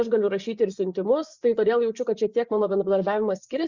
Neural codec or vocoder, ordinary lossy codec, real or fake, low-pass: vocoder, 24 kHz, 100 mel bands, Vocos; Opus, 64 kbps; fake; 7.2 kHz